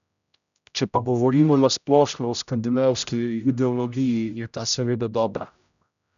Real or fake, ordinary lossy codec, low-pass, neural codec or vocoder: fake; none; 7.2 kHz; codec, 16 kHz, 0.5 kbps, X-Codec, HuBERT features, trained on general audio